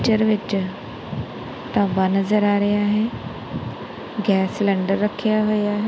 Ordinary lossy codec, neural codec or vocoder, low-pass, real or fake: none; none; none; real